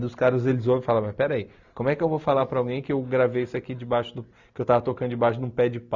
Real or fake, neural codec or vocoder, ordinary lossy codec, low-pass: real; none; none; 7.2 kHz